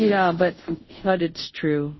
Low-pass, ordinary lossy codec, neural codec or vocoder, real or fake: 7.2 kHz; MP3, 24 kbps; codec, 24 kHz, 0.5 kbps, DualCodec; fake